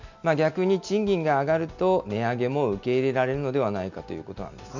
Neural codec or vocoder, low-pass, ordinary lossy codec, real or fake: none; 7.2 kHz; none; real